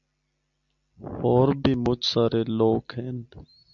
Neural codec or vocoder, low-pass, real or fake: none; 7.2 kHz; real